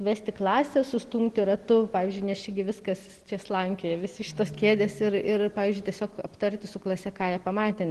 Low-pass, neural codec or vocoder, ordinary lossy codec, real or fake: 10.8 kHz; none; Opus, 16 kbps; real